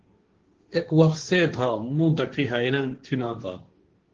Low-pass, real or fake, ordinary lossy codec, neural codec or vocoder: 7.2 kHz; fake; Opus, 16 kbps; codec, 16 kHz, 1.1 kbps, Voila-Tokenizer